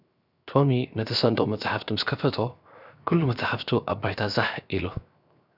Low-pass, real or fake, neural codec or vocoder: 5.4 kHz; fake; codec, 16 kHz, 0.7 kbps, FocalCodec